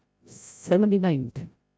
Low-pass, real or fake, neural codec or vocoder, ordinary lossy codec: none; fake; codec, 16 kHz, 0.5 kbps, FreqCodec, larger model; none